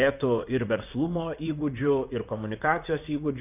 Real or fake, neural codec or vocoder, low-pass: fake; vocoder, 44.1 kHz, 128 mel bands, Pupu-Vocoder; 3.6 kHz